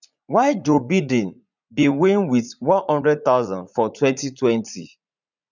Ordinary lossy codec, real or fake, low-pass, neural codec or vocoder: none; fake; 7.2 kHz; vocoder, 44.1 kHz, 80 mel bands, Vocos